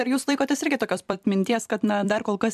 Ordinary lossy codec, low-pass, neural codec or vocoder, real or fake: MP3, 96 kbps; 14.4 kHz; vocoder, 44.1 kHz, 128 mel bands every 256 samples, BigVGAN v2; fake